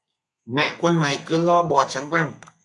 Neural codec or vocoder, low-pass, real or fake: codec, 32 kHz, 1.9 kbps, SNAC; 10.8 kHz; fake